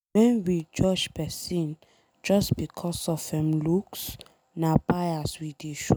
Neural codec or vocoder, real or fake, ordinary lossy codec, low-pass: none; real; none; none